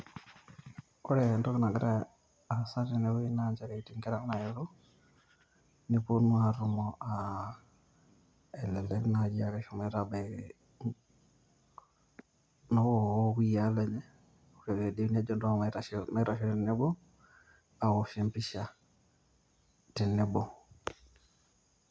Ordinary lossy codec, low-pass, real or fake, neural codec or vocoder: none; none; real; none